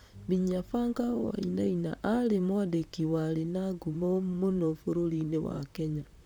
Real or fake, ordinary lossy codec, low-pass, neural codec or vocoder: fake; none; none; vocoder, 44.1 kHz, 128 mel bands, Pupu-Vocoder